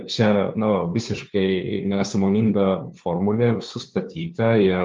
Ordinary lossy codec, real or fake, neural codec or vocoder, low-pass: Opus, 32 kbps; fake; codec, 16 kHz, 2 kbps, FunCodec, trained on LibriTTS, 25 frames a second; 7.2 kHz